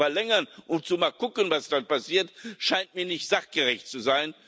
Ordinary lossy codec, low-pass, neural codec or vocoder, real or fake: none; none; none; real